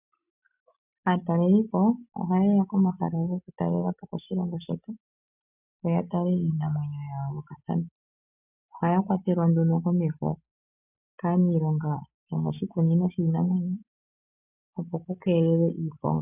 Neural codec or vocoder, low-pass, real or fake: none; 3.6 kHz; real